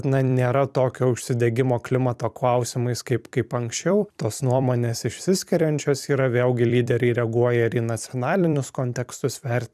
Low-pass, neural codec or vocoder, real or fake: 14.4 kHz; vocoder, 44.1 kHz, 128 mel bands every 256 samples, BigVGAN v2; fake